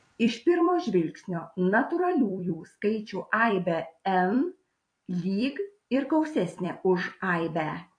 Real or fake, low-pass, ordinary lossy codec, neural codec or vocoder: fake; 9.9 kHz; AAC, 48 kbps; vocoder, 44.1 kHz, 128 mel bands every 256 samples, BigVGAN v2